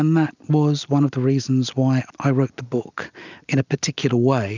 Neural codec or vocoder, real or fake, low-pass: none; real; 7.2 kHz